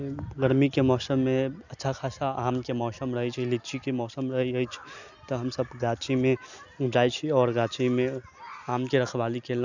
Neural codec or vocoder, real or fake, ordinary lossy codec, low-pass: none; real; none; 7.2 kHz